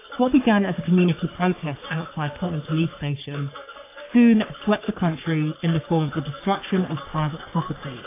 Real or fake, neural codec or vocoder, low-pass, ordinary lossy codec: fake; codec, 44.1 kHz, 3.4 kbps, Pupu-Codec; 3.6 kHz; AAC, 24 kbps